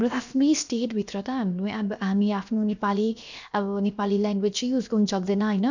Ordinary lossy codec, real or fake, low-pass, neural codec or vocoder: none; fake; 7.2 kHz; codec, 16 kHz, 0.7 kbps, FocalCodec